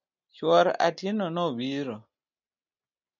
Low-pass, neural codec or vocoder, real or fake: 7.2 kHz; none; real